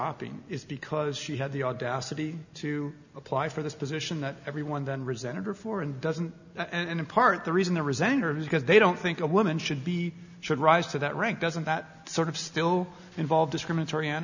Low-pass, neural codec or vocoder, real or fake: 7.2 kHz; none; real